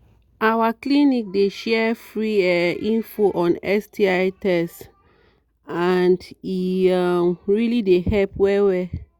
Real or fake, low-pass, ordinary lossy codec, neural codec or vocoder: real; 19.8 kHz; Opus, 64 kbps; none